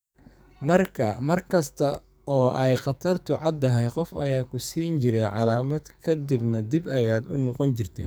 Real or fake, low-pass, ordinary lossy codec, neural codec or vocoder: fake; none; none; codec, 44.1 kHz, 2.6 kbps, SNAC